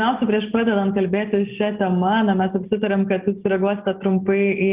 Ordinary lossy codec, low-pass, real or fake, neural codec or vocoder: Opus, 32 kbps; 3.6 kHz; real; none